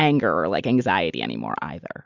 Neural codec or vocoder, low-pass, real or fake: none; 7.2 kHz; real